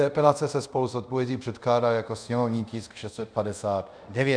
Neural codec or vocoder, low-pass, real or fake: codec, 24 kHz, 0.5 kbps, DualCodec; 9.9 kHz; fake